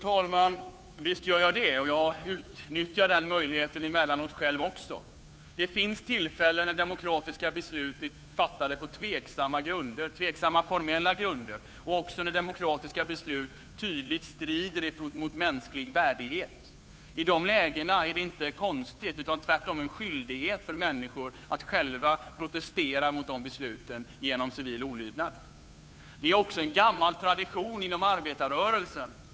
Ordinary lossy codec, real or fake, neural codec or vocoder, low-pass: none; fake; codec, 16 kHz, 2 kbps, FunCodec, trained on Chinese and English, 25 frames a second; none